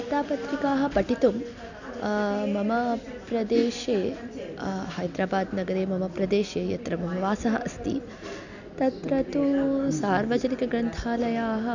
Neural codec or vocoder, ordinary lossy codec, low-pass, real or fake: none; none; 7.2 kHz; real